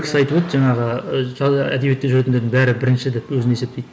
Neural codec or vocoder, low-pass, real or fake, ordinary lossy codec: none; none; real; none